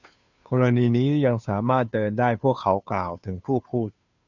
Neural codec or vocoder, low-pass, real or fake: codec, 16 kHz, 2 kbps, FunCodec, trained on Chinese and English, 25 frames a second; 7.2 kHz; fake